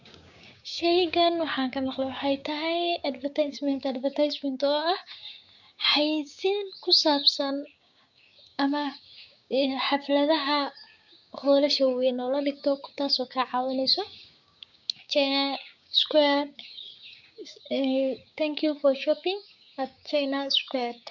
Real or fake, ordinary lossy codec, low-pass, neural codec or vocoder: fake; none; 7.2 kHz; codec, 16 kHz, 6 kbps, DAC